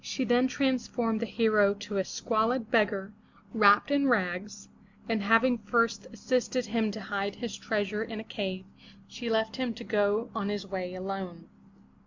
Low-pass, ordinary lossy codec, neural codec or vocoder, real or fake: 7.2 kHz; MP3, 64 kbps; none; real